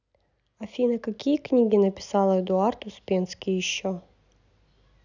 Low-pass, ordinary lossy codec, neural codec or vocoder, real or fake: 7.2 kHz; none; none; real